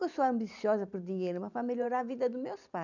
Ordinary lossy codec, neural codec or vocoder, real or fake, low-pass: none; vocoder, 44.1 kHz, 128 mel bands every 512 samples, BigVGAN v2; fake; 7.2 kHz